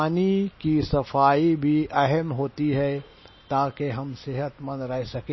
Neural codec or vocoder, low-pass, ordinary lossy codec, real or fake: none; 7.2 kHz; MP3, 24 kbps; real